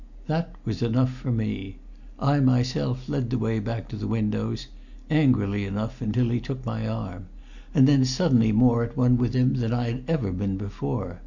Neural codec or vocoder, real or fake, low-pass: none; real; 7.2 kHz